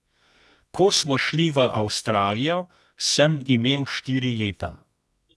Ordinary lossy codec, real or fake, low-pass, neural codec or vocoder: none; fake; none; codec, 24 kHz, 0.9 kbps, WavTokenizer, medium music audio release